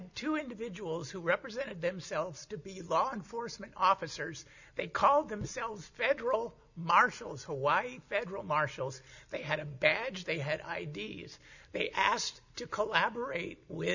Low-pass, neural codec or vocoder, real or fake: 7.2 kHz; none; real